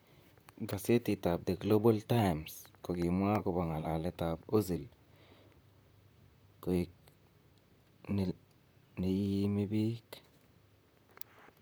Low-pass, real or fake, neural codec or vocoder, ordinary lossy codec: none; fake; vocoder, 44.1 kHz, 128 mel bands, Pupu-Vocoder; none